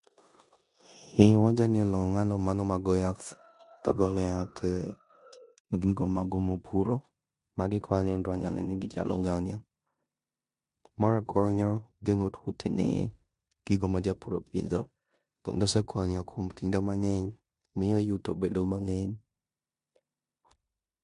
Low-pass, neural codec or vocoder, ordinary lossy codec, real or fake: 10.8 kHz; codec, 16 kHz in and 24 kHz out, 0.9 kbps, LongCat-Audio-Codec, four codebook decoder; MP3, 64 kbps; fake